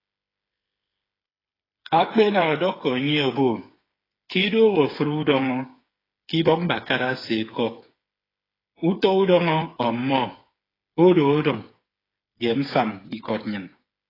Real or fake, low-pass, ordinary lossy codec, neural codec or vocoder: fake; 5.4 kHz; AAC, 24 kbps; codec, 16 kHz, 8 kbps, FreqCodec, smaller model